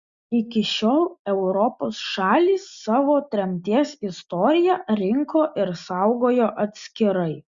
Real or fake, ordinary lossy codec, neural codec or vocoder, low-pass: real; Opus, 64 kbps; none; 7.2 kHz